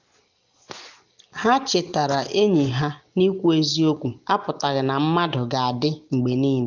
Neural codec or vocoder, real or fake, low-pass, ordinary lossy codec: none; real; 7.2 kHz; none